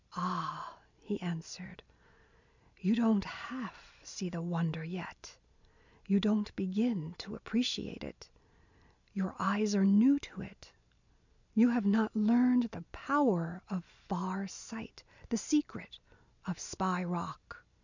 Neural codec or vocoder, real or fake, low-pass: none; real; 7.2 kHz